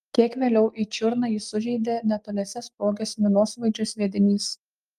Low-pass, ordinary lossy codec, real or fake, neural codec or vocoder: 14.4 kHz; Opus, 32 kbps; real; none